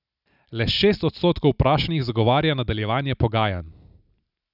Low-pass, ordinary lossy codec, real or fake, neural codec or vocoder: 5.4 kHz; none; real; none